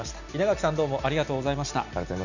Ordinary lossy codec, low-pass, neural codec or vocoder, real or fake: none; 7.2 kHz; none; real